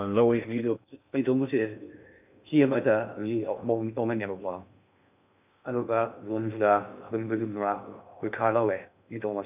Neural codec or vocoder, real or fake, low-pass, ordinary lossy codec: codec, 16 kHz in and 24 kHz out, 0.6 kbps, FocalCodec, streaming, 4096 codes; fake; 3.6 kHz; none